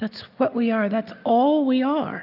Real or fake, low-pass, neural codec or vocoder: real; 5.4 kHz; none